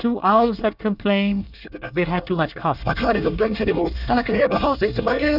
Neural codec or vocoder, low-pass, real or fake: codec, 24 kHz, 1 kbps, SNAC; 5.4 kHz; fake